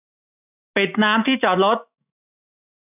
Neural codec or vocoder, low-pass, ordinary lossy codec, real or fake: autoencoder, 48 kHz, 128 numbers a frame, DAC-VAE, trained on Japanese speech; 3.6 kHz; none; fake